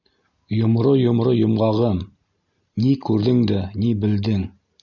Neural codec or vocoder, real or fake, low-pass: none; real; 7.2 kHz